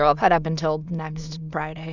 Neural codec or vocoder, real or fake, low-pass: autoencoder, 22.05 kHz, a latent of 192 numbers a frame, VITS, trained on many speakers; fake; 7.2 kHz